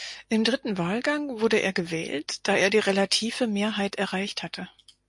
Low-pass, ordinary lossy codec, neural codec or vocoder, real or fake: 10.8 kHz; MP3, 48 kbps; none; real